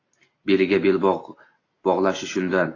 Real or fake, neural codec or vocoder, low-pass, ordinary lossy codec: real; none; 7.2 kHz; AAC, 32 kbps